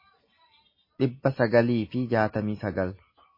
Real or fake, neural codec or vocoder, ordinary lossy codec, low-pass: real; none; MP3, 24 kbps; 5.4 kHz